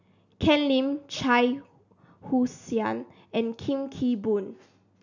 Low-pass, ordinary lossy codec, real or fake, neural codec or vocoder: 7.2 kHz; none; real; none